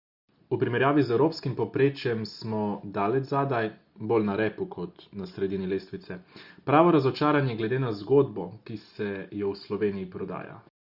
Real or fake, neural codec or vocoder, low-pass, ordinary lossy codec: real; none; 5.4 kHz; Opus, 64 kbps